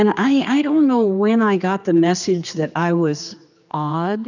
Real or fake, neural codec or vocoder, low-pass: fake; codec, 16 kHz, 2 kbps, X-Codec, HuBERT features, trained on general audio; 7.2 kHz